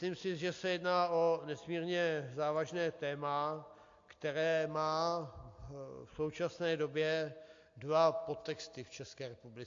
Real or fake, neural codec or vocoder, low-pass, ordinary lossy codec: real; none; 7.2 kHz; AAC, 48 kbps